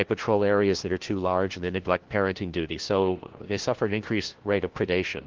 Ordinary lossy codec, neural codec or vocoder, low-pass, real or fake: Opus, 16 kbps; codec, 16 kHz, 1 kbps, FunCodec, trained on LibriTTS, 50 frames a second; 7.2 kHz; fake